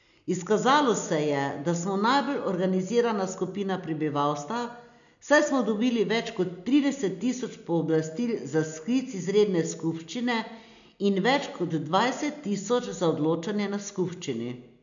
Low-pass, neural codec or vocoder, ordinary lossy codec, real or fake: 7.2 kHz; none; none; real